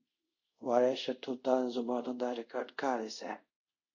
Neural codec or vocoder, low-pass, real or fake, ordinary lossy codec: codec, 24 kHz, 0.5 kbps, DualCodec; 7.2 kHz; fake; MP3, 48 kbps